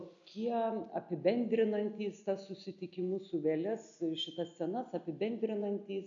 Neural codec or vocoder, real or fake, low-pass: none; real; 7.2 kHz